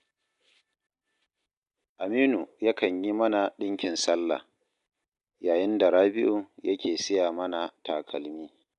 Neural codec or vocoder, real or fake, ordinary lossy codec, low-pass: none; real; none; 10.8 kHz